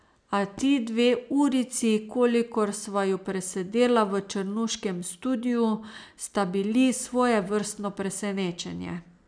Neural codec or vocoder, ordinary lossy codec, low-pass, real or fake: none; none; 9.9 kHz; real